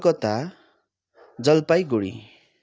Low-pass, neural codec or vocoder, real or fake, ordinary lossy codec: none; none; real; none